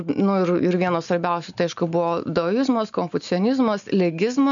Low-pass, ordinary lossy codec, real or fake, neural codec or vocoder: 7.2 kHz; MP3, 96 kbps; real; none